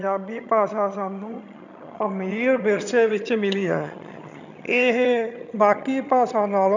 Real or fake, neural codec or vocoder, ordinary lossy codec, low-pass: fake; vocoder, 22.05 kHz, 80 mel bands, HiFi-GAN; none; 7.2 kHz